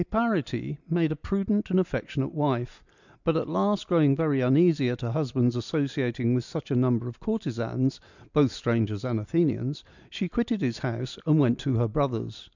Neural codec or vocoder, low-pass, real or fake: none; 7.2 kHz; real